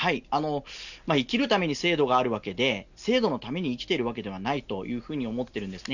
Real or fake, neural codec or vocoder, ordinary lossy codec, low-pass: real; none; none; 7.2 kHz